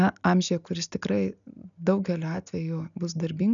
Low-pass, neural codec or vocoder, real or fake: 7.2 kHz; none; real